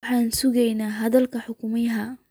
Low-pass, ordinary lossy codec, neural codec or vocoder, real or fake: none; none; none; real